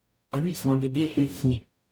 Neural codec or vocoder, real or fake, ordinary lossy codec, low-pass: codec, 44.1 kHz, 0.9 kbps, DAC; fake; none; none